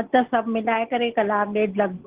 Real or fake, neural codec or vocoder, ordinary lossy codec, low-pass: real; none; Opus, 16 kbps; 3.6 kHz